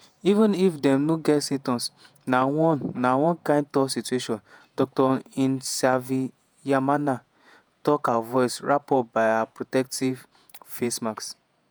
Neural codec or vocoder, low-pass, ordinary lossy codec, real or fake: vocoder, 48 kHz, 128 mel bands, Vocos; none; none; fake